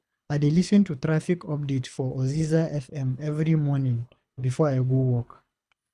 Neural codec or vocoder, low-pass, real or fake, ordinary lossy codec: codec, 24 kHz, 6 kbps, HILCodec; none; fake; none